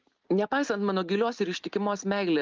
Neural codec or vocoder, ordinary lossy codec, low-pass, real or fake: none; Opus, 24 kbps; 7.2 kHz; real